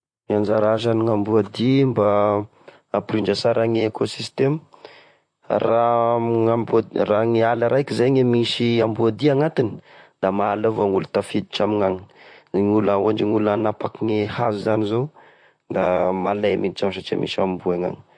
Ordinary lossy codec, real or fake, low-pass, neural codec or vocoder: MP3, 48 kbps; fake; 9.9 kHz; vocoder, 44.1 kHz, 128 mel bands, Pupu-Vocoder